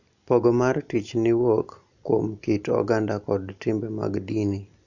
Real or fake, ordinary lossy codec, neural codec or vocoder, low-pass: real; none; none; 7.2 kHz